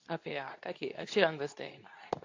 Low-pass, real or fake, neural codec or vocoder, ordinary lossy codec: 7.2 kHz; fake; codec, 24 kHz, 0.9 kbps, WavTokenizer, medium speech release version 2; AAC, 48 kbps